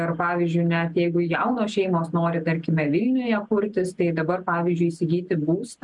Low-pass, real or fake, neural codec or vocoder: 10.8 kHz; real; none